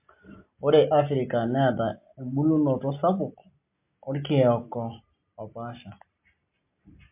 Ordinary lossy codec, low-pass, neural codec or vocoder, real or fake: MP3, 32 kbps; 3.6 kHz; none; real